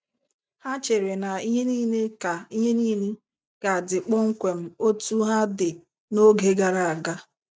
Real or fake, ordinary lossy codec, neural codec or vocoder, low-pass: real; none; none; none